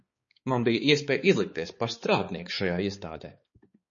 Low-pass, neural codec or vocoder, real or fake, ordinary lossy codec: 7.2 kHz; codec, 16 kHz, 4 kbps, X-Codec, HuBERT features, trained on balanced general audio; fake; MP3, 32 kbps